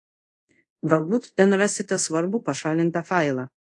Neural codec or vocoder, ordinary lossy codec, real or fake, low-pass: codec, 24 kHz, 0.5 kbps, DualCodec; AAC, 48 kbps; fake; 10.8 kHz